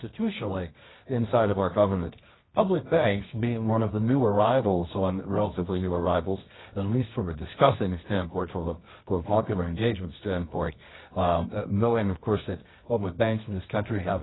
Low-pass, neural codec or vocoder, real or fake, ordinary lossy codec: 7.2 kHz; codec, 24 kHz, 0.9 kbps, WavTokenizer, medium music audio release; fake; AAC, 16 kbps